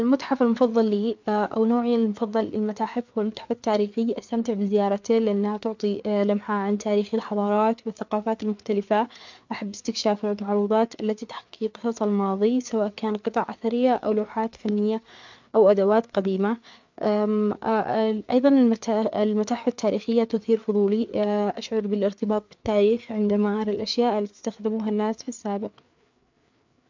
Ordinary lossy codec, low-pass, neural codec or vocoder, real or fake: MP3, 64 kbps; 7.2 kHz; codec, 16 kHz, 4 kbps, FreqCodec, larger model; fake